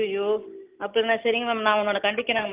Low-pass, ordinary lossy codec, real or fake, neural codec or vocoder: 3.6 kHz; Opus, 24 kbps; real; none